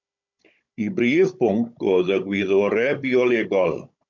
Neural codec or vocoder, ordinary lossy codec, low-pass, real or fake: codec, 16 kHz, 16 kbps, FunCodec, trained on Chinese and English, 50 frames a second; MP3, 64 kbps; 7.2 kHz; fake